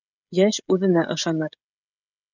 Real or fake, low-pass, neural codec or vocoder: fake; 7.2 kHz; codec, 16 kHz, 16 kbps, FreqCodec, smaller model